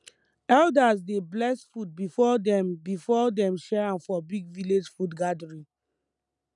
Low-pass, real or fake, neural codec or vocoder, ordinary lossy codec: 10.8 kHz; real; none; none